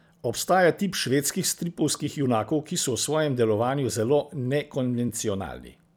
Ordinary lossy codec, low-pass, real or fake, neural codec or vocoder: none; none; fake; vocoder, 44.1 kHz, 128 mel bands every 256 samples, BigVGAN v2